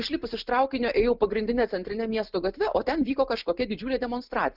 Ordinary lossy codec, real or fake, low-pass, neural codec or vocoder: Opus, 32 kbps; real; 5.4 kHz; none